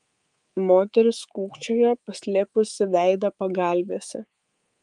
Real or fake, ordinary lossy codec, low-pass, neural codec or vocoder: fake; Opus, 32 kbps; 10.8 kHz; codec, 24 kHz, 3.1 kbps, DualCodec